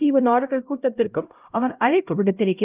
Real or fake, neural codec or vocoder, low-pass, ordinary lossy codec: fake; codec, 16 kHz, 0.5 kbps, X-Codec, HuBERT features, trained on LibriSpeech; 3.6 kHz; Opus, 32 kbps